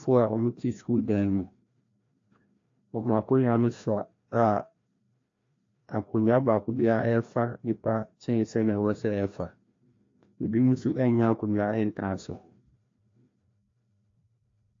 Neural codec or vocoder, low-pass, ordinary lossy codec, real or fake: codec, 16 kHz, 1 kbps, FreqCodec, larger model; 7.2 kHz; AAC, 48 kbps; fake